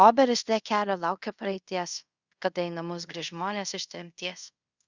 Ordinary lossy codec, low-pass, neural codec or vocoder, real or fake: Opus, 64 kbps; 7.2 kHz; codec, 24 kHz, 0.5 kbps, DualCodec; fake